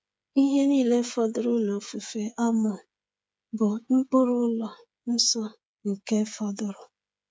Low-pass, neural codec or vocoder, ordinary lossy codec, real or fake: none; codec, 16 kHz, 8 kbps, FreqCodec, smaller model; none; fake